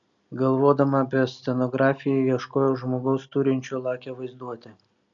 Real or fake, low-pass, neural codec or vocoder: real; 7.2 kHz; none